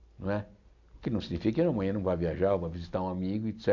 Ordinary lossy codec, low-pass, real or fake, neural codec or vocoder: none; 7.2 kHz; real; none